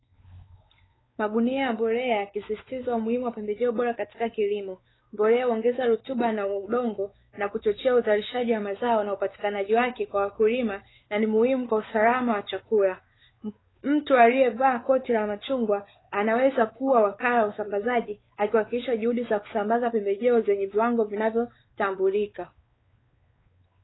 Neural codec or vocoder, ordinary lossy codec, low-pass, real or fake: codec, 16 kHz, 4 kbps, X-Codec, WavLM features, trained on Multilingual LibriSpeech; AAC, 16 kbps; 7.2 kHz; fake